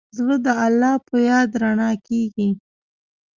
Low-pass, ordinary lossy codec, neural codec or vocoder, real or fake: 7.2 kHz; Opus, 32 kbps; none; real